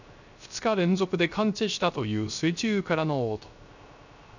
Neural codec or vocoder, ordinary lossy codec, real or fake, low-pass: codec, 16 kHz, 0.3 kbps, FocalCodec; none; fake; 7.2 kHz